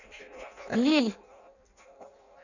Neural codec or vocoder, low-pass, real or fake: codec, 16 kHz in and 24 kHz out, 0.6 kbps, FireRedTTS-2 codec; 7.2 kHz; fake